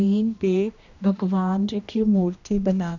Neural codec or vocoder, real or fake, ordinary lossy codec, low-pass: codec, 16 kHz, 1 kbps, X-Codec, HuBERT features, trained on general audio; fake; none; 7.2 kHz